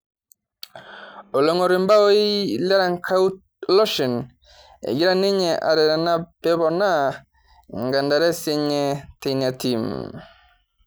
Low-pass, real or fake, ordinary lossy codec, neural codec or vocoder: none; real; none; none